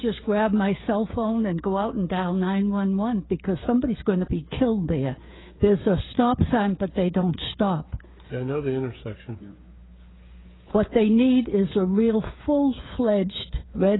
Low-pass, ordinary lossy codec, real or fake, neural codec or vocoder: 7.2 kHz; AAC, 16 kbps; fake; codec, 16 kHz, 8 kbps, FreqCodec, smaller model